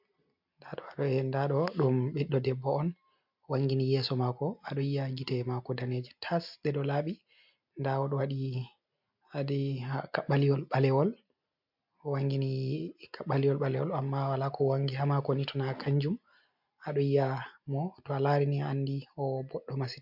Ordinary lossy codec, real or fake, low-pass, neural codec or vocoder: MP3, 48 kbps; real; 5.4 kHz; none